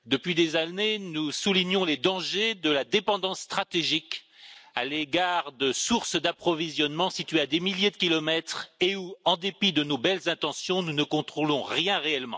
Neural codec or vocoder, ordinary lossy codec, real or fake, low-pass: none; none; real; none